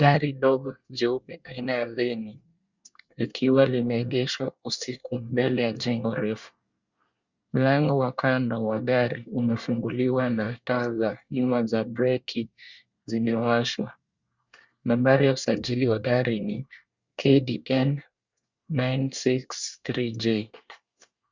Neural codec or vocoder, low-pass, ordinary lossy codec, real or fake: codec, 24 kHz, 1 kbps, SNAC; 7.2 kHz; Opus, 64 kbps; fake